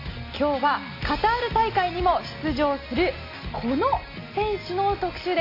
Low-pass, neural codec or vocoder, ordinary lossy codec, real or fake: 5.4 kHz; none; AAC, 32 kbps; real